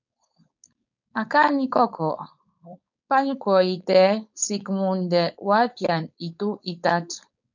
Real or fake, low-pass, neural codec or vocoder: fake; 7.2 kHz; codec, 16 kHz, 4.8 kbps, FACodec